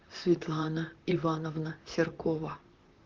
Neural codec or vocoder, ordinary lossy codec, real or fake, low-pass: vocoder, 44.1 kHz, 128 mel bands, Pupu-Vocoder; Opus, 16 kbps; fake; 7.2 kHz